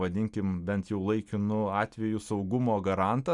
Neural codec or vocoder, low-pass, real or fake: none; 10.8 kHz; real